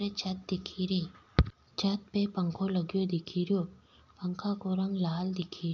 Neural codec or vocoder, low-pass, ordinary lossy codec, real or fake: none; 7.2 kHz; none; real